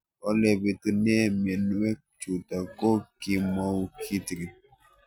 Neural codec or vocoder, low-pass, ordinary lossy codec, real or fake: none; 19.8 kHz; none; real